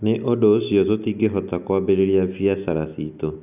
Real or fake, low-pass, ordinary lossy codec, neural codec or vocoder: real; 3.6 kHz; none; none